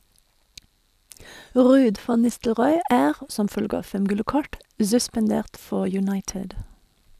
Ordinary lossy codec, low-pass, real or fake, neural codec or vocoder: none; 14.4 kHz; real; none